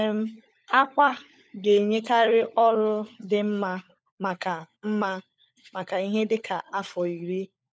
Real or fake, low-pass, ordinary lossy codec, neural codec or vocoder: fake; none; none; codec, 16 kHz, 16 kbps, FunCodec, trained on LibriTTS, 50 frames a second